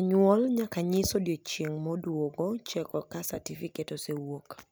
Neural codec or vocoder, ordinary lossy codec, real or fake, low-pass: none; none; real; none